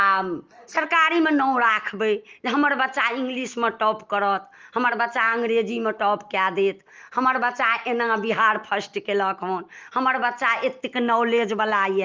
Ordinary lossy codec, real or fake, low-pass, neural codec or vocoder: Opus, 24 kbps; real; 7.2 kHz; none